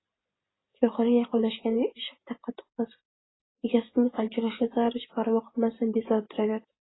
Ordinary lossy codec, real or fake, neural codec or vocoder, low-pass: AAC, 16 kbps; real; none; 7.2 kHz